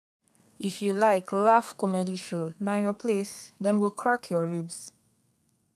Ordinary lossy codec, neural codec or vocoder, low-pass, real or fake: none; codec, 32 kHz, 1.9 kbps, SNAC; 14.4 kHz; fake